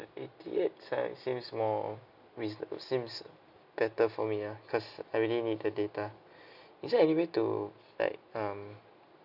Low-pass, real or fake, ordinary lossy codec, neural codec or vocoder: 5.4 kHz; real; none; none